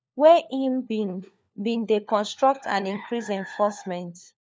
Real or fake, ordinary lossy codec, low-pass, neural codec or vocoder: fake; none; none; codec, 16 kHz, 4 kbps, FunCodec, trained on LibriTTS, 50 frames a second